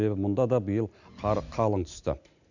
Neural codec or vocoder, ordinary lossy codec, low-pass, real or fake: none; none; 7.2 kHz; real